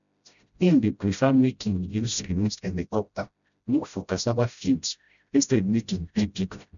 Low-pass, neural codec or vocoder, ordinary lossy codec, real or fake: 7.2 kHz; codec, 16 kHz, 0.5 kbps, FreqCodec, smaller model; none; fake